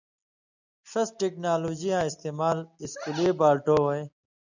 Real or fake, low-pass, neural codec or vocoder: real; 7.2 kHz; none